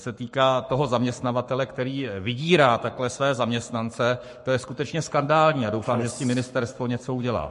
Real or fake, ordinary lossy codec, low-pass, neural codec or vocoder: fake; MP3, 48 kbps; 14.4 kHz; codec, 44.1 kHz, 7.8 kbps, Pupu-Codec